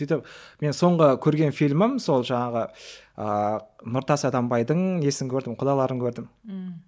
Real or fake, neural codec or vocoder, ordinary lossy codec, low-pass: real; none; none; none